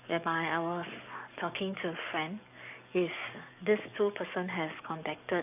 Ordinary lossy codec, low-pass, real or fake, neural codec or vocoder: none; 3.6 kHz; fake; codec, 44.1 kHz, 7.8 kbps, DAC